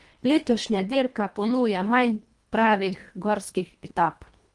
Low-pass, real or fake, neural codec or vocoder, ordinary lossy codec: 10.8 kHz; fake; codec, 24 kHz, 1.5 kbps, HILCodec; Opus, 24 kbps